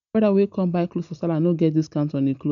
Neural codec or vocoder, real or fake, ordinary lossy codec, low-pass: none; real; none; 7.2 kHz